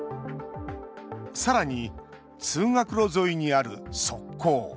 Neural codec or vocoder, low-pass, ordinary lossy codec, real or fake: none; none; none; real